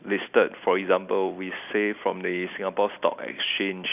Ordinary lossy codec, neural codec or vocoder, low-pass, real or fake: none; none; 3.6 kHz; real